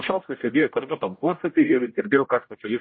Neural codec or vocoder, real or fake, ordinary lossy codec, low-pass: codec, 16 kHz, 0.5 kbps, X-Codec, HuBERT features, trained on general audio; fake; MP3, 24 kbps; 7.2 kHz